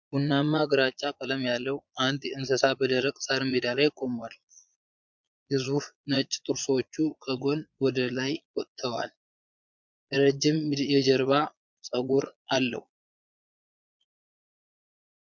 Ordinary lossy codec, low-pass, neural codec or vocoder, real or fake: MP3, 64 kbps; 7.2 kHz; vocoder, 22.05 kHz, 80 mel bands, Vocos; fake